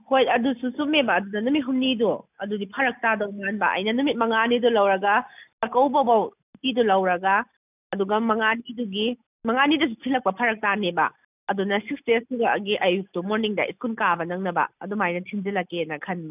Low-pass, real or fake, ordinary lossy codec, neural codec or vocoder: 3.6 kHz; real; none; none